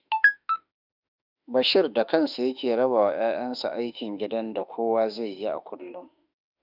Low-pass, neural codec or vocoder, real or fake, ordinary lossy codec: 5.4 kHz; autoencoder, 48 kHz, 32 numbers a frame, DAC-VAE, trained on Japanese speech; fake; none